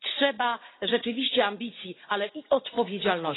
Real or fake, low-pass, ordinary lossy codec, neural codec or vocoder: fake; 7.2 kHz; AAC, 16 kbps; vocoder, 44.1 kHz, 80 mel bands, Vocos